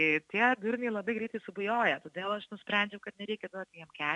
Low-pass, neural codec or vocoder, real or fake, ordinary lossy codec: 10.8 kHz; none; real; AAC, 64 kbps